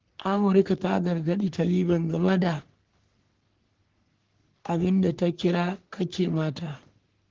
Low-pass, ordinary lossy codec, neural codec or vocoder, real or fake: 7.2 kHz; Opus, 16 kbps; codec, 44.1 kHz, 3.4 kbps, Pupu-Codec; fake